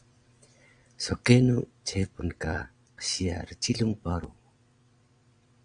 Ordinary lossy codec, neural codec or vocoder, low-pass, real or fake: Opus, 64 kbps; vocoder, 22.05 kHz, 80 mel bands, Vocos; 9.9 kHz; fake